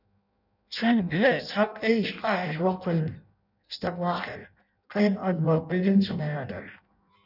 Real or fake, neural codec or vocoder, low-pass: fake; codec, 16 kHz in and 24 kHz out, 0.6 kbps, FireRedTTS-2 codec; 5.4 kHz